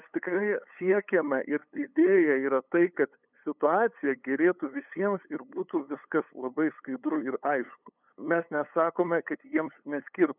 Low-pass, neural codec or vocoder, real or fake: 3.6 kHz; codec, 16 kHz, 8 kbps, FunCodec, trained on LibriTTS, 25 frames a second; fake